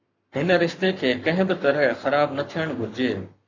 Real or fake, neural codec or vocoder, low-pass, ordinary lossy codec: fake; codec, 44.1 kHz, 7.8 kbps, Pupu-Codec; 7.2 kHz; MP3, 64 kbps